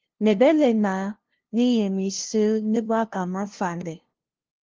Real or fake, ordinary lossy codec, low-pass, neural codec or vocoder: fake; Opus, 16 kbps; 7.2 kHz; codec, 16 kHz, 0.5 kbps, FunCodec, trained on LibriTTS, 25 frames a second